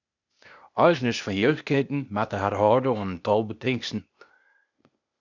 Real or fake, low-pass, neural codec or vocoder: fake; 7.2 kHz; codec, 16 kHz, 0.8 kbps, ZipCodec